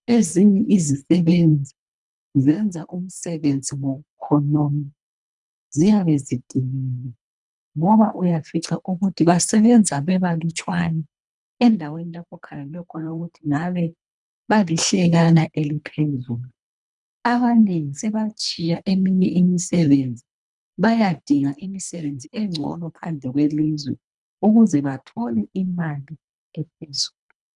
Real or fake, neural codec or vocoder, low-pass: fake; codec, 24 kHz, 3 kbps, HILCodec; 10.8 kHz